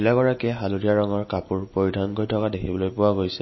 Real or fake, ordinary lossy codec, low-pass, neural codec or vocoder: real; MP3, 24 kbps; 7.2 kHz; none